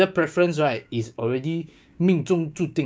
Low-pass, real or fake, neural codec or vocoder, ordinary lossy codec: none; fake; codec, 16 kHz, 6 kbps, DAC; none